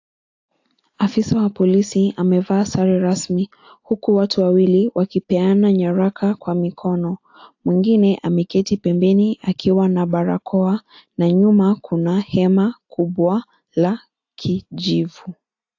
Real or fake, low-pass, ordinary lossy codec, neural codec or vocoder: real; 7.2 kHz; AAC, 48 kbps; none